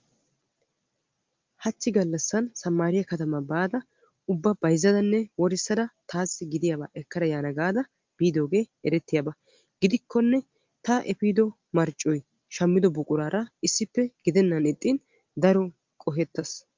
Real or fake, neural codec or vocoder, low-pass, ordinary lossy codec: real; none; 7.2 kHz; Opus, 32 kbps